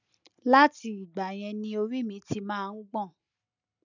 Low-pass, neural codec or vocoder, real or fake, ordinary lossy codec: 7.2 kHz; none; real; none